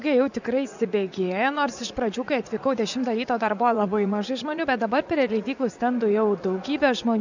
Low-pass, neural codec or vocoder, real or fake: 7.2 kHz; vocoder, 44.1 kHz, 80 mel bands, Vocos; fake